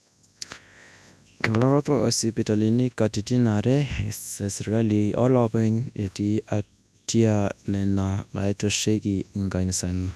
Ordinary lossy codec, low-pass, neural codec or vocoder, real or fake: none; none; codec, 24 kHz, 0.9 kbps, WavTokenizer, large speech release; fake